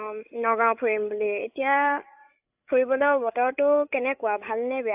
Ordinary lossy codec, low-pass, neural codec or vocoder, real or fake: AAC, 32 kbps; 3.6 kHz; codec, 16 kHz, 8 kbps, FreqCodec, larger model; fake